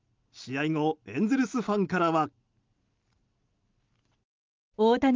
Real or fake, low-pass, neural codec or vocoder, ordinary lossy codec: real; 7.2 kHz; none; Opus, 32 kbps